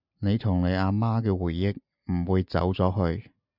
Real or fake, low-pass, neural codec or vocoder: real; 5.4 kHz; none